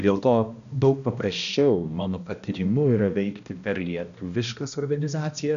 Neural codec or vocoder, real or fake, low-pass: codec, 16 kHz, 1 kbps, X-Codec, HuBERT features, trained on balanced general audio; fake; 7.2 kHz